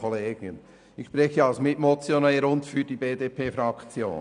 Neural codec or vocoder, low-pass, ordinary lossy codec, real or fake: none; 9.9 kHz; none; real